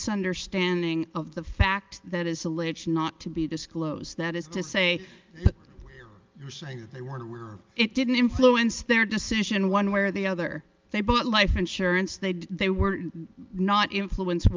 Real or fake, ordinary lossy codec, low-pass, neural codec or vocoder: real; Opus, 24 kbps; 7.2 kHz; none